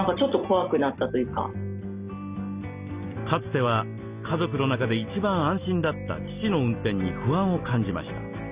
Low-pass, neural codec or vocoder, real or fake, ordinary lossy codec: 3.6 kHz; none; real; Opus, 64 kbps